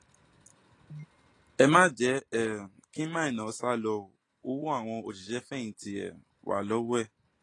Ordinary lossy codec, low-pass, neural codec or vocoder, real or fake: AAC, 32 kbps; 10.8 kHz; none; real